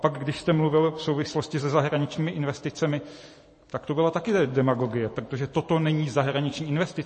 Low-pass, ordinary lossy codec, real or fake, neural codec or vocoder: 10.8 kHz; MP3, 32 kbps; fake; vocoder, 44.1 kHz, 128 mel bands every 512 samples, BigVGAN v2